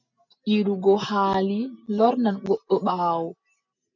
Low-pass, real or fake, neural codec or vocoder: 7.2 kHz; real; none